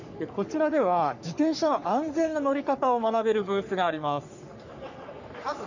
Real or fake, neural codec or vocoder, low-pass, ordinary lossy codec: fake; codec, 44.1 kHz, 3.4 kbps, Pupu-Codec; 7.2 kHz; none